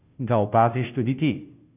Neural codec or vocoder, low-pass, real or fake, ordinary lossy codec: codec, 16 kHz, 0.5 kbps, FunCodec, trained on Chinese and English, 25 frames a second; 3.6 kHz; fake; none